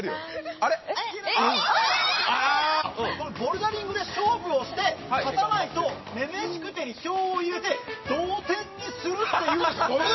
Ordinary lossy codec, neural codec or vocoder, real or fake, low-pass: MP3, 24 kbps; none; real; 7.2 kHz